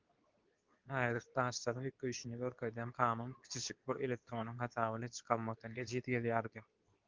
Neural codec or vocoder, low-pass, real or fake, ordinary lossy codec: codec, 24 kHz, 0.9 kbps, WavTokenizer, medium speech release version 2; 7.2 kHz; fake; Opus, 32 kbps